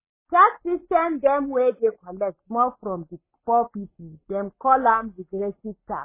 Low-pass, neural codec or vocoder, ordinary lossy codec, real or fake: 3.6 kHz; codec, 44.1 kHz, 7.8 kbps, Pupu-Codec; MP3, 16 kbps; fake